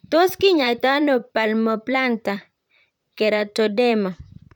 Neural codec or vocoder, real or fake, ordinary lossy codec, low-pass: vocoder, 44.1 kHz, 128 mel bands, Pupu-Vocoder; fake; none; 19.8 kHz